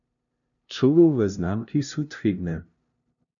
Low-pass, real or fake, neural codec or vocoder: 7.2 kHz; fake; codec, 16 kHz, 0.5 kbps, FunCodec, trained on LibriTTS, 25 frames a second